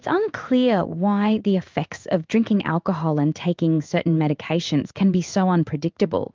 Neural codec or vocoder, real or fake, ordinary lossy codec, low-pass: codec, 16 kHz in and 24 kHz out, 1 kbps, XY-Tokenizer; fake; Opus, 24 kbps; 7.2 kHz